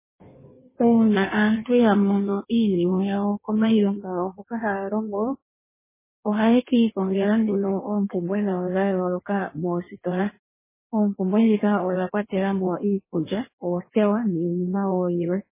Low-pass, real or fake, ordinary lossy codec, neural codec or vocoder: 3.6 kHz; fake; MP3, 16 kbps; codec, 16 kHz in and 24 kHz out, 1.1 kbps, FireRedTTS-2 codec